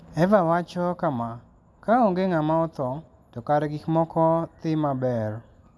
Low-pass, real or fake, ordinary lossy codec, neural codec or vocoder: none; real; none; none